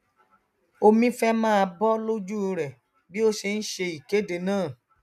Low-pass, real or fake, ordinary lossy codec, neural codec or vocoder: 14.4 kHz; real; none; none